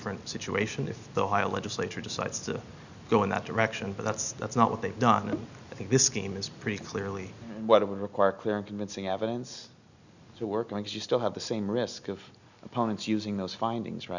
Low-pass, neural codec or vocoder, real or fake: 7.2 kHz; none; real